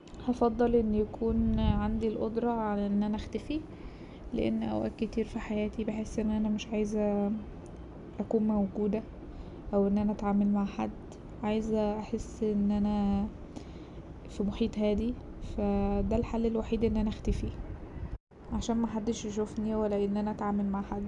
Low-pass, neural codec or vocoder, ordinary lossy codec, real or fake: 10.8 kHz; none; none; real